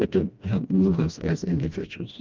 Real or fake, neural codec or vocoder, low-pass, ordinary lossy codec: fake; codec, 16 kHz, 1 kbps, FreqCodec, smaller model; 7.2 kHz; Opus, 24 kbps